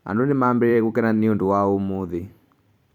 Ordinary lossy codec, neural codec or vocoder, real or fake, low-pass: none; vocoder, 44.1 kHz, 128 mel bands every 512 samples, BigVGAN v2; fake; 19.8 kHz